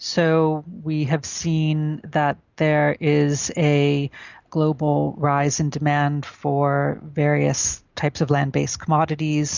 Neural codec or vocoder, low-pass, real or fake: none; 7.2 kHz; real